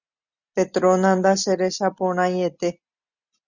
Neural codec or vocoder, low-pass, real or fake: none; 7.2 kHz; real